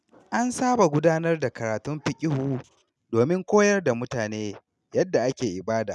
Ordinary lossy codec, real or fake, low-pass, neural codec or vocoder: none; real; none; none